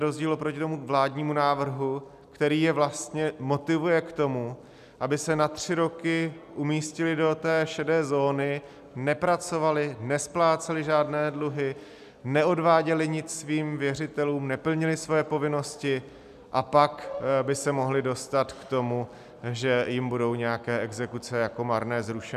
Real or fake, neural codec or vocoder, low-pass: real; none; 14.4 kHz